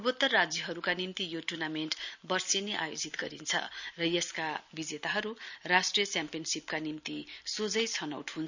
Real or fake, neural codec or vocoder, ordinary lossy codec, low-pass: real; none; none; 7.2 kHz